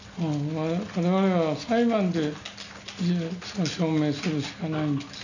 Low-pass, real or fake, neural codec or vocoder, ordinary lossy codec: 7.2 kHz; real; none; none